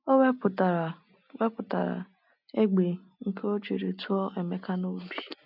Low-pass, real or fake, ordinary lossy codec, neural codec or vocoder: 5.4 kHz; real; none; none